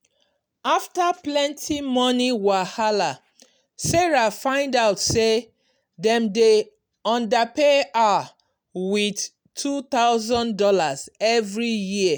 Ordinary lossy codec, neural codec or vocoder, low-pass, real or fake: none; none; none; real